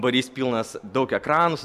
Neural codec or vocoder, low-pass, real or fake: none; 14.4 kHz; real